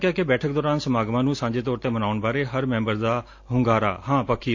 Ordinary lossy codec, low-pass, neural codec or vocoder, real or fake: AAC, 48 kbps; 7.2 kHz; none; real